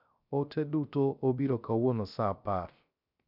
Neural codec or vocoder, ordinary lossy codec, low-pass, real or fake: codec, 16 kHz, 0.3 kbps, FocalCodec; none; 5.4 kHz; fake